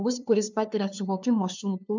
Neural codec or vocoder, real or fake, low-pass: codec, 16 kHz, 2 kbps, FunCodec, trained on LibriTTS, 25 frames a second; fake; 7.2 kHz